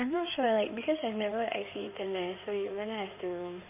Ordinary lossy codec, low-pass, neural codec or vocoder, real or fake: none; 3.6 kHz; codec, 16 kHz in and 24 kHz out, 2.2 kbps, FireRedTTS-2 codec; fake